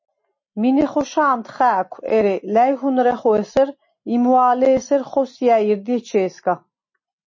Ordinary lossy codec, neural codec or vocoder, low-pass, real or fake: MP3, 32 kbps; none; 7.2 kHz; real